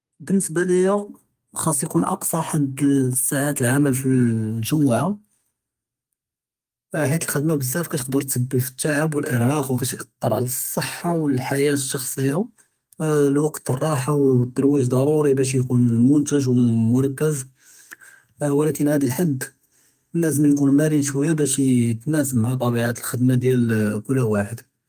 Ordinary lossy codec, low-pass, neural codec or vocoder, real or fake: Opus, 32 kbps; 14.4 kHz; codec, 32 kHz, 1.9 kbps, SNAC; fake